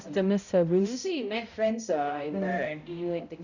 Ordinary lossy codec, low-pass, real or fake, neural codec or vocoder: none; 7.2 kHz; fake; codec, 16 kHz, 0.5 kbps, X-Codec, HuBERT features, trained on balanced general audio